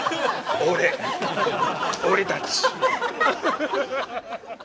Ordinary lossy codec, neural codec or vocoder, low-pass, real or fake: none; none; none; real